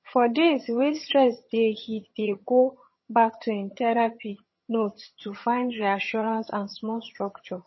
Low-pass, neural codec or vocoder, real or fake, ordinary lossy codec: 7.2 kHz; vocoder, 22.05 kHz, 80 mel bands, HiFi-GAN; fake; MP3, 24 kbps